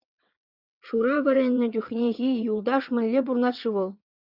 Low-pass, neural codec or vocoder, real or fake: 5.4 kHz; vocoder, 22.05 kHz, 80 mel bands, WaveNeXt; fake